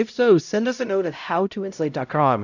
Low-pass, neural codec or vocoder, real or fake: 7.2 kHz; codec, 16 kHz, 0.5 kbps, X-Codec, HuBERT features, trained on LibriSpeech; fake